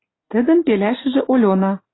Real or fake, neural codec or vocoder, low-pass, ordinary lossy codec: real; none; 7.2 kHz; AAC, 16 kbps